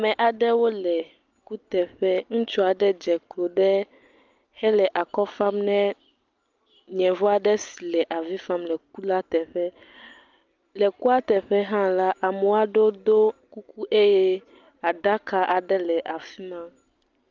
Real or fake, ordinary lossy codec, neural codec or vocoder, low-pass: real; Opus, 24 kbps; none; 7.2 kHz